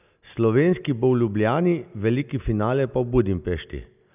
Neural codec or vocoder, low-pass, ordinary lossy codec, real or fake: none; 3.6 kHz; none; real